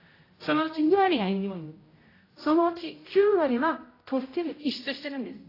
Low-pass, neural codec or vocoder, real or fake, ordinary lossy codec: 5.4 kHz; codec, 16 kHz, 0.5 kbps, X-Codec, HuBERT features, trained on general audio; fake; AAC, 24 kbps